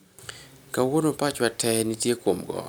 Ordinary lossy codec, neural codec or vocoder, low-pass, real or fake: none; none; none; real